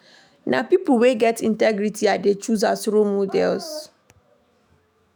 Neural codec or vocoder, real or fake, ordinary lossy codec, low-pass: autoencoder, 48 kHz, 128 numbers a frame, DAC-VAE, trained on Japanese speech; fake; none; none